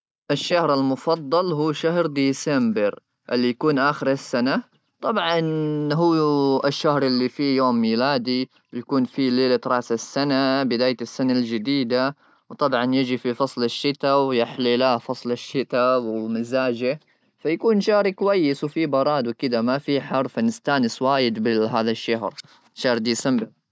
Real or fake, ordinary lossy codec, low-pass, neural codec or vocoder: real; none; none; none